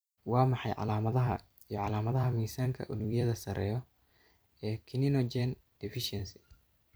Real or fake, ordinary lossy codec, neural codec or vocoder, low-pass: fake; none; vocoder, 44.1 kHz, 128 mel bands every 256 samples, BigVGAN v2; none